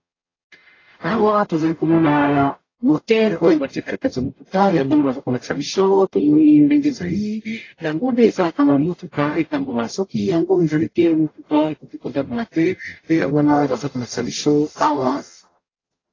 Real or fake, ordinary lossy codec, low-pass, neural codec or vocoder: fake; AAC, 32 kbps; 7.2 kHz; codec, 44.1 kHz, 0.9 kbps, DAC